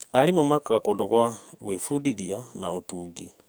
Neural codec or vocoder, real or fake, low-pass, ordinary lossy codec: codec, 44.1 kHz, 2.6 kbps, SNAC; fake; none; none